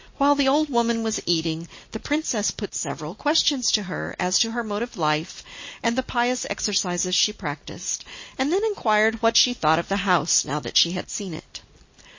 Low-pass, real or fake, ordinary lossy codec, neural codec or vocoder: 7.2 kHz; real; MP3, 32 kbps; none